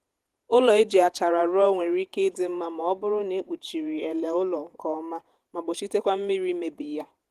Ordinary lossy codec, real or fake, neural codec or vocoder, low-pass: Opus, 24 kbps; fake; vocoder, 48 kHz, 128 mel bands, Vocos; 14.4 kHz